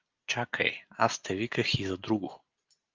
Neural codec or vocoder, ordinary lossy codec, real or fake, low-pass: none; Opus, 32 kbps; real; 7.2 kHz